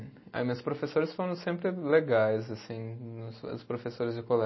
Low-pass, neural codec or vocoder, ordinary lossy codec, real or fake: 7.2 kHz; none; MP3, 24 kbps; real